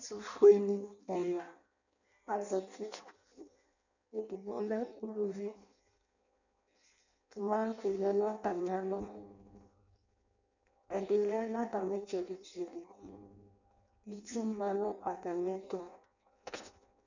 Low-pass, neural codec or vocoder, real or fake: 7.2 kHz; codec, 16 kHz in and 24 kHz out, 0.6 kbps, FireRedTTS-2 codec; fake